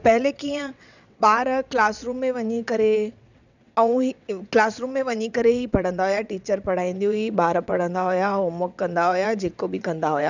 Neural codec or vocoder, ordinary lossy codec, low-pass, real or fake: vocoder, 22.05 kHz, 80 mel bands, WaveNeXt; none; 7.2 kHz; fake